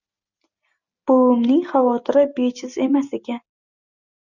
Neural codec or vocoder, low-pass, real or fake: none; 7.2 kHz; real